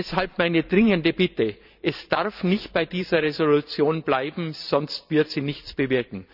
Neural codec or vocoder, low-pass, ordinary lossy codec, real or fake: vocoder, 44.1 kHz, 128 mel bands every 512 samples, BigVGAN v2; 5.4 kHz; none; fake